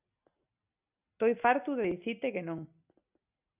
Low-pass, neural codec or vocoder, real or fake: 3.6 kHz; none; real